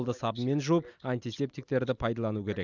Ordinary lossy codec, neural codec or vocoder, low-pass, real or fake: none; none; 7.2 kHz; real